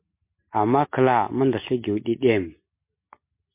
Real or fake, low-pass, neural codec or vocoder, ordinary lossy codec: real; 3.6 kHz; none; MP3, 24 kbps